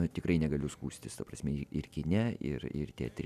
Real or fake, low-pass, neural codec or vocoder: real; 14.4 kHz; none